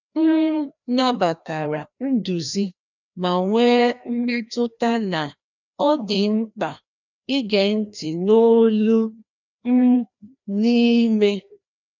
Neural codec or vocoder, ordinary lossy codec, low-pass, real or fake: codec, 16 kHz, 1 kbps, FreqCodec, larger model; none; 7.2 kHz; fake